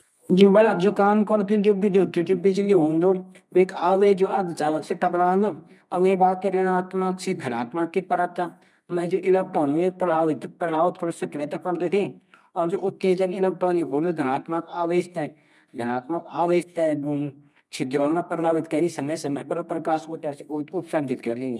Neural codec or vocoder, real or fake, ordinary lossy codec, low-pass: codec, 24 kHz, 0.9 kbps, WavTokenizer, medium music audio release; fake; none; none